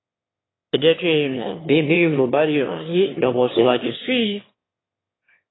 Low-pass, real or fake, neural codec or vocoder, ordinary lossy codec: 7.2 kHz; fake; autoencoder, 22.05 kHz, a latent of 192 numbers a frame, VITS, trained on one speaker; AAC, 16 kbps